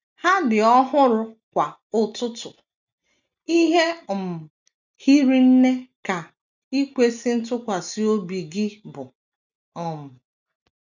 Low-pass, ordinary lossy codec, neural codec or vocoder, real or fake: 7.2 kHz; none; none; real